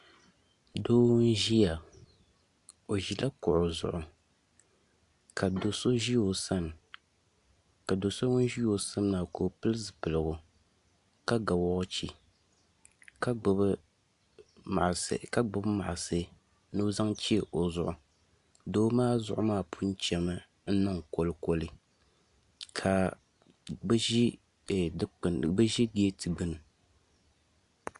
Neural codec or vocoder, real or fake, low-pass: none; real; 10.8 kHz